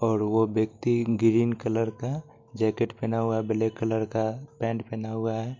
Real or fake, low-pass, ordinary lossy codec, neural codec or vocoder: real; 7.2 kHz; MP3, 48 kbps; none